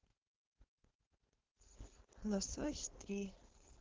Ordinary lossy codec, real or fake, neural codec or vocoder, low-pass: Opus, 16 kbps; fake; codec, 16 kHz, 4.8 kbps, FACodec; 7.2 kHz